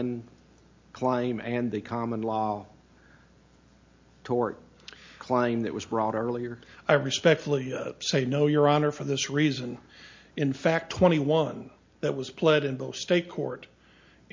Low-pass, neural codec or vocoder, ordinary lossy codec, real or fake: 7.2 kHz; none; MP3, 48 kbps; real